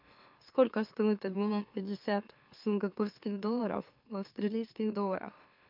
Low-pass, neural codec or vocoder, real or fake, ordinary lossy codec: 5.4 kHz; autoencoder, 44.1 kHz, a latent of 192 numbers a frame, MeloTTS; fake; MP3, 48 kbps